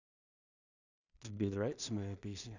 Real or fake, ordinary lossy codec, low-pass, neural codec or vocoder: fake; none; 7.2 kHz; codec, 16 kHz in and 24 kHz out, 0.4 kbps, LongCat-Audio-Codec, two codebook decoder